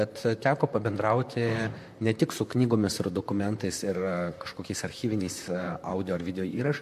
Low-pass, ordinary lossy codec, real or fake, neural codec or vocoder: 14.4 kHz; MP3, 64 kbps; fake; vocoder, 44.1 kHz, 128 mel bands, Pupu-Vocoder